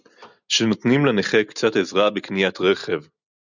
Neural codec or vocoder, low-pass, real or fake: none; 7.2 kHz; real